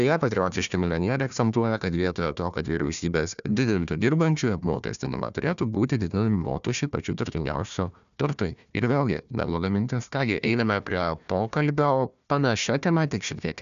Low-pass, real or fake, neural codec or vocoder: 7.2 kHz; fake; codec, 16 kHz, 1 kbps, FunCodec, trained on Chinese and English, 50 frames a second